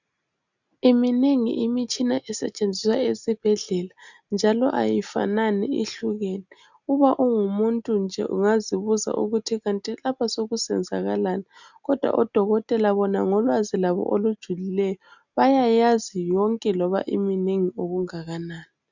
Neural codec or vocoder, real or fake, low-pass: none; real; 7.2 kHz